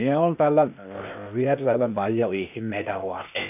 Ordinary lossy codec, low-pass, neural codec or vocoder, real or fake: none; 3.6 kHz; codec, 16 kHz, 0.8 kbps, ZipCodec; fake